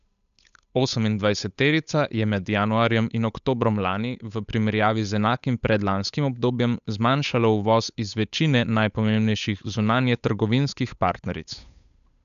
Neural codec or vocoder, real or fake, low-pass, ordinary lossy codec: codec, 16 kHz, 8 kbps, FunCodec, trained on Chinese and English, 25 frames a second; fake; 7.2 kHz; none